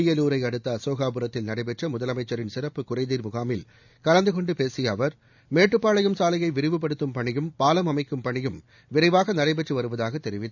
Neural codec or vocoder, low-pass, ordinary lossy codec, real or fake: none; 7.2 kHz; none; real